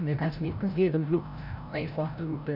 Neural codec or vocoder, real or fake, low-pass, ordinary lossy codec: codec, 16 kHz, 0.5 kbps, FreqCodec, larger model; fake; 5.4 kHz; none